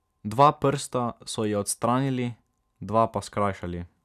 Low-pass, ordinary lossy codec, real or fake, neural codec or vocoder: 14.4 kHz; none; real; none